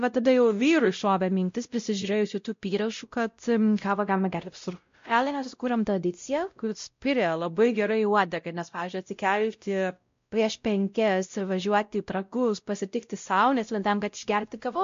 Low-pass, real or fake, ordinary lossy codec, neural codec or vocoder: 7.2 kHz; fake; MP3, 48 kbps; codec, 16 kHz, 0.5 kbps, X-Codec, WavLM features, trained on Multilingual LibriSpeech